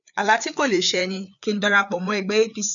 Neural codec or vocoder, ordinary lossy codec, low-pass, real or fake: codec, 16 kHz, 4 kbps, FreqCodec, larger model; none; 7.2 kHz; fake